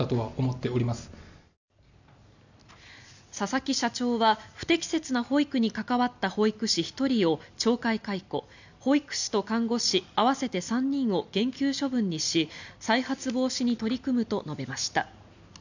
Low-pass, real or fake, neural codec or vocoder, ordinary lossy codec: 7.2 kHz; real; none; none